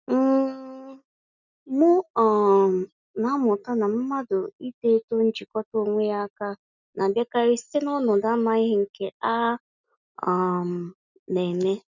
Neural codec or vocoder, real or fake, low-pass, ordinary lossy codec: none; real; 7.2 kHz; none